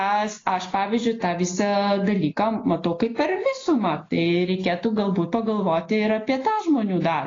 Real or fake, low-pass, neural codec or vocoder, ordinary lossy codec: real; 7.2 kHz; none; AAC, 32 kbps